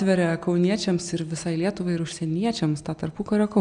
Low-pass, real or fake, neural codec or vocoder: 9.9 kHz; real; none